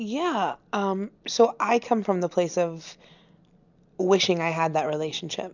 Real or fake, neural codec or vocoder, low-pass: real; none; 7.2 kHz